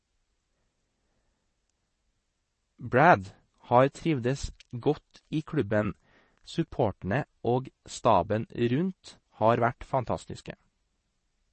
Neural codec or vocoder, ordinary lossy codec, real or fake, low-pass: vocoder, 22.05 kHz, 80 mel bands, WaveNeXt; MP3, 32 kbps; fake; 9.9 kHz